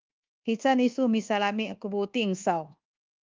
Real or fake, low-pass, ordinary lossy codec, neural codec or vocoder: fake; 7.2 kHz; Opus, 32 kbps; codec, 24 kHz, 1.2 kbps, DualCodec